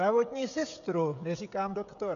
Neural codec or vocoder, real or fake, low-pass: codec, 16 kHz, 16 kbps, FreqCodec, smaller model; fake; 7.2 kHz